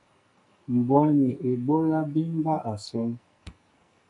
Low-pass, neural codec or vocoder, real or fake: 10.8 kHz; codec, 44.1 kHz, 2.6 kbps, SNAC; fake